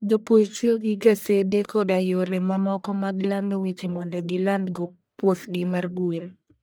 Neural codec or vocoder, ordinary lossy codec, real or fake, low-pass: codec, 44.1 kHz, 1.7 kbps, Pupu-Codec; none; fake; none